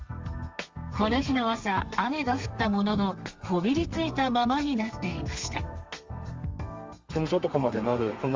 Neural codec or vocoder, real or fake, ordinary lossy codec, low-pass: codec, 32 kHz, 1.9 kbps, SNAC; fake; Opus, 32 kbps; 7.2 kHz